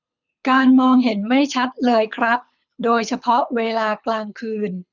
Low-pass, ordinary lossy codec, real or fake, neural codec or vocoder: 7.2 kHz; none; fake; codec, 24 kHz, 6 kbps, HILCodec